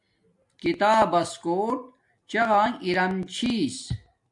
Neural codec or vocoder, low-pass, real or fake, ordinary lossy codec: none; 10.8 kHz; real; AAC, 64 kbps